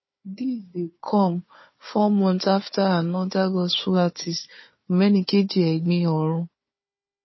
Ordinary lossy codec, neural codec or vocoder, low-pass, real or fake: MP3, 24 kbps; codec, 16 kHz, 4 kbps, FunCodec, trained on Chinese and English, 50 frames a second; 7.2 kHz; fake